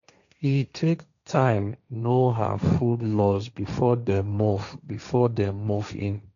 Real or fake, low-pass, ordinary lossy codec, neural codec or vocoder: fake; 7.2 kHz; none; codec, 16 kHz, 1.1 kbps, Voila-Tokenizer